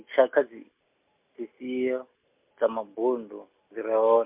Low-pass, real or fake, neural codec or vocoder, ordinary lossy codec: 3.6 kHz; real; none; MP3, 32 kbps